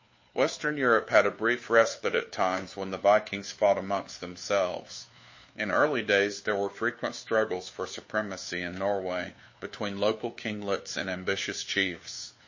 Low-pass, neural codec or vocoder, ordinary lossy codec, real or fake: 7.2 kHz; codec, 16 kHz, 2 kbps, FunCodec, trained on Chinese and English, 25 frames a second; MP3, 32 kbps; fake